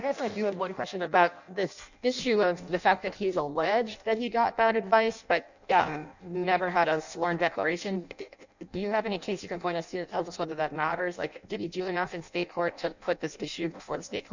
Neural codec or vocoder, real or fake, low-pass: codec, 16 kHz in and 24 kHz out, 0.6 kbps, FireRedTTS-2 codec; fake; 7.2 kHz